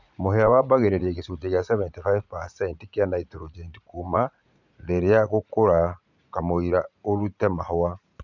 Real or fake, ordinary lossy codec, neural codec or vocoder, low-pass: fake; none; vocoder, 44.1 kHz, 80 mel bands, Vocos; 7.2 kHz